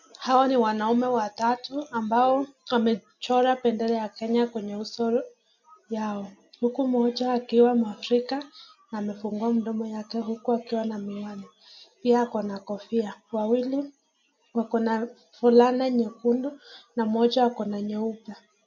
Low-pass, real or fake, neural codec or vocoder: 7.2 kHz; real; none